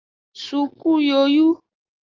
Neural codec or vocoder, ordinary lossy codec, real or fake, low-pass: none; Opus, 32 kbps; real; 7.2 kHz